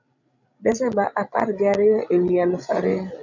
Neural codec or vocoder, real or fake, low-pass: codec, 16 kHz, 16 kbps, FreqCodec, larger model; fake; 7.2 kHz